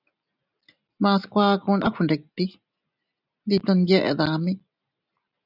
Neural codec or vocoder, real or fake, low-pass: none; real; 5.4 kHz